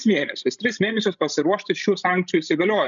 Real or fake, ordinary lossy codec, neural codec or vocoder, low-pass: fake; MP3, 96 kbps; codec, 16 kHz, 16 kbps, FreqCodec, larger model; 7.2 kHz